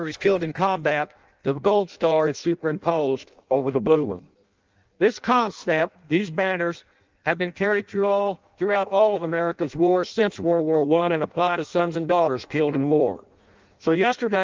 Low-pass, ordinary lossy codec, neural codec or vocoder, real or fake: 7.2 kHz; Opus, 24 kbps; codec, 16 kHz in and 24 kHz out, 0.6 kbps, FireRedTTS-2 codec; fake